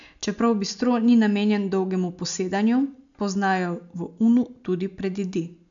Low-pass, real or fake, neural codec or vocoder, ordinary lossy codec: 7.2 kHz; real; none; none